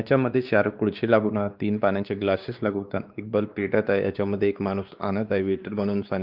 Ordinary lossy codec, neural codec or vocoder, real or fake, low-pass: Opus, 32 kbps; codec, 16 kHz, 2 kbps, X-Codec, WavLM features, trained on Multilingual LibriSpeech; fake; 5.4 kHz